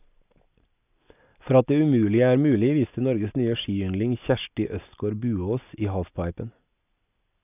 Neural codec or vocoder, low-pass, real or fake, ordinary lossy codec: none; 3.6 kHz; real; none